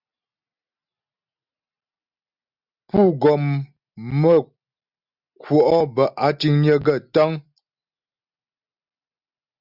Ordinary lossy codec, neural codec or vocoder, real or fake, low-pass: Opus, 64 kbps; none; real; 5.4 kHz